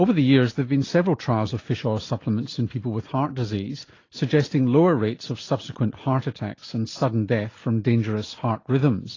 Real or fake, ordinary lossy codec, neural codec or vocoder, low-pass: real; AAC, 32 kbps; none; 7.2 kHz